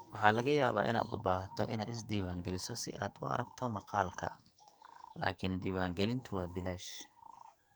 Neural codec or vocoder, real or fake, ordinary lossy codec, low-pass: codec, 44.1 kHz, 2.6 kbps, SNAC; fake; none; none